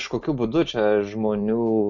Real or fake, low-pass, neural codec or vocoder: real; 7.2 kHz; none